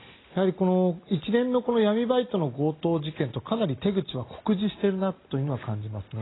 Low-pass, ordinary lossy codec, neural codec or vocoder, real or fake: 7.2 kHz; AAC, 16 kbps; none; real